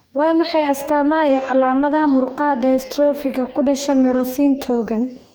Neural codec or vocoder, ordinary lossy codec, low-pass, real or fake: codec, 44.1 kHz, 2.6 kbps, DAC; none; none; fake